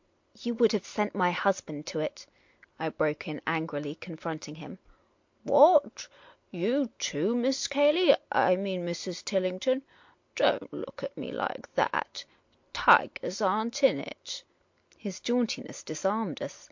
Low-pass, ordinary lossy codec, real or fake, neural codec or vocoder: 7.2 kHz; MP3, 48 kbps; real; none